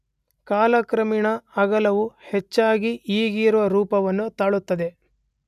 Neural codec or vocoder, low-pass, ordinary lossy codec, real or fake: none; 14.4 kHz; none; real